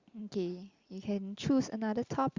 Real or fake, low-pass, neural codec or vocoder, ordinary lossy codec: real; 7.2 kHz; none; Opus, 64 kbps